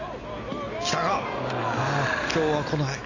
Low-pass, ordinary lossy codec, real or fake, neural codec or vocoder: 7.2 kHz; AAC, 32 kbps; real; none